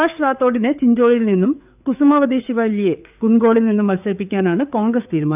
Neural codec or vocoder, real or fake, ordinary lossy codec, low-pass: codec, 16 kHz, 4 kbps, FreqCodec, larger model; fake; none; 3.6 kHz